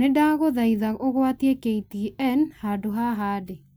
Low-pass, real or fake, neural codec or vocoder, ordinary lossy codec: none; real; none; none